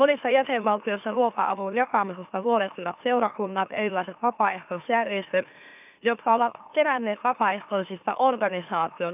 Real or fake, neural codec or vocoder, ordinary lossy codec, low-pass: fake; autoencoder, 44.1 kHz, a latent of 192 numbers a frame, MeloTTS; none; 3.6 kHz